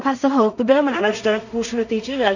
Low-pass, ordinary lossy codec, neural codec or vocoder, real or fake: 7.2 kHz; none; codec, 16 kHz in and 24 kHz out, 0.4 kbps, LongCat-Audio-Codec, two codebook decoder; fake